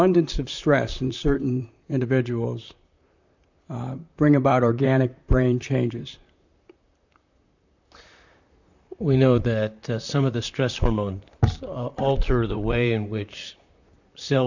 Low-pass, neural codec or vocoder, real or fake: 7.2 kHz; vocoder, 44.1 kHz, 128 mel bands, Pupu-Vocoder; fake